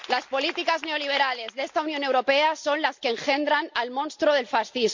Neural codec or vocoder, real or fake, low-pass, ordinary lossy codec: none; real; 7.2 kHz; MP3, 48 kbps